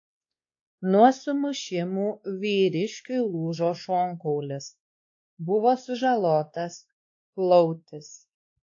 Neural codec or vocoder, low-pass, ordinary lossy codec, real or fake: codec, 16 kHz, 2 kbps, X-Codec, WavLM features, trained on Multilingual LibriSpeech; 7.2 kHz; AAC, 48 kbps; fake